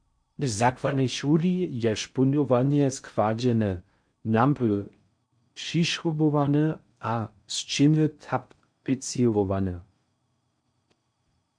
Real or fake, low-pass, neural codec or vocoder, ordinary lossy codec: fake; 9.9 kHz; codec, 16 kHz in and 24 kHz out, 0.6 kbps, FocalCodec, streaming, 4096 codes; MP3, 64 kbps